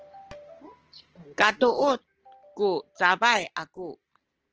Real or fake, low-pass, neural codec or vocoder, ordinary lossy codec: real; 7.2 kHz; none; Opus, 16 kbps